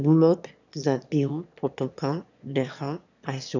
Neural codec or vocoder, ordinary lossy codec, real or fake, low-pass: autoencoder, 22.05 kHz, a latent of 192 numbers a frame, VITS, trained on one speaker; none; fake; 7.2 kHz